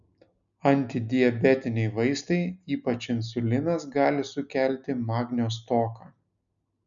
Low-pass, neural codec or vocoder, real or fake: 7.2 kHz; none; real